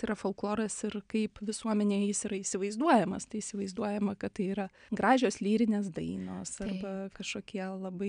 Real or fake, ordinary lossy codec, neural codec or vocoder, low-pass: real; MP3, 96 kbps; none; 9.9 kHz